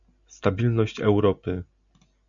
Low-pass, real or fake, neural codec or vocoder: 7.2 kHz; real; none